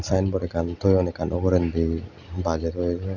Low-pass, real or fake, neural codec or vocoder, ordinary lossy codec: 7.2 kHz; real; none; none